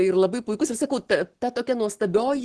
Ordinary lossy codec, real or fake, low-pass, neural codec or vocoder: Opus, 16 kbps; fake; 10.8 kHz; codec, 44.1 kHz, 7.8 kbps, Pupu-Codec